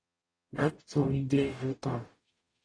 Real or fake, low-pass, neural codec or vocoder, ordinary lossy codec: fake; 9.9 kHz; codec, 44.1 kHz, 0.9 kbps, DAC; AAC, 32 kbps